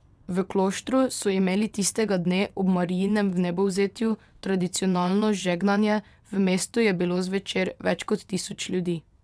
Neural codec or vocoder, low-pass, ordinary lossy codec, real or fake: vocoder, 22.05 kHz, 80 mel bands, WaveNeXt; none; none; fake